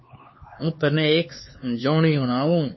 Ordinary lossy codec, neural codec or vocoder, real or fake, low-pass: MP3, 24 kbps; codec, 16 kHz, 4 kbps, X-Codec, HuBERT features, trained on LibriSpeech; fake; 7.2 kHz